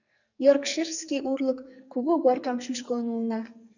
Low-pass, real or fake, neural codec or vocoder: 7.2 kHz; fake; codec, 44.1 kHz, 2.6 kbps, SNAC